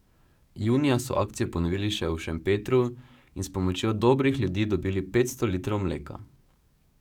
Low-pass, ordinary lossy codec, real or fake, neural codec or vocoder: 19.8 kHz; none; fake; codec, 44.1 kHz, 7.8 kbps, DAC